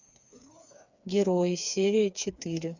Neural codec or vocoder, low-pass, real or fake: codec, 16 kHz, 4 kbps, FreqCodec, smaller model; 7.2 kHz; fake